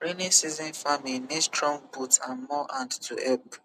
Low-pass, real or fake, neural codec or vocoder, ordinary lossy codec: 14.4 kHz; real; none; none